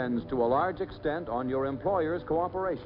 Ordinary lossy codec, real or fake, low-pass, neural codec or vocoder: MP3, 48 kbps; real; 5.4 kHz; none